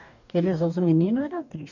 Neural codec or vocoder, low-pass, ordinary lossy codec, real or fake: codec, 44.1 kHz, 2.6 kbps, DAC; 7.2 kHz; none; fake